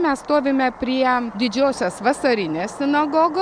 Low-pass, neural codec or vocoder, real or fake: 9.9 kHz; none; real